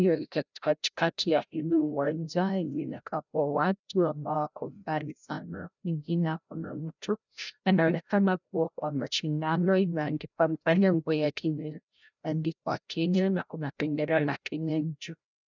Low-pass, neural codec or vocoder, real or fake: 7.2 kHz; codec, 16 kHz, 0.5 kbps, FreqCodec, larger model; fake